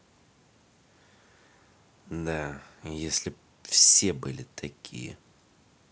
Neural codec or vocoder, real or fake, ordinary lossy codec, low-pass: none; real; none; none